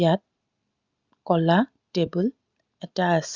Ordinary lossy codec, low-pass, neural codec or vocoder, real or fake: Opus, 64 kbps; 7.2 kHz; none; real